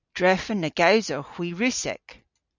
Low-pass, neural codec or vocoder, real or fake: 7.2 kHz; none; real